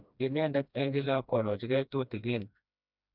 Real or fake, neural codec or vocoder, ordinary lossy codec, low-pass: fake; codec, 16 kHz, 1 kbps, FreqCodec, smaller model; none; 5.4 kHz